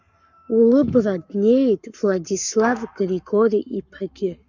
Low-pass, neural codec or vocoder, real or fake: 7.2 kHz; codec, 44.1 kHz, 7.8 kbps, Pupu-Codec; fake